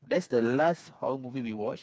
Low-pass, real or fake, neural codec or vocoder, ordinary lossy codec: none; fake; codec, 16 kHz, 4 kbps, FreqCodec, smaller model; none